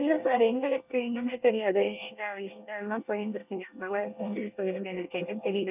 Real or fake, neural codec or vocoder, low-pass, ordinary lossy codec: fake; codec, 24 kHz, 1 kbps, SNAC; 3.6 kHz; none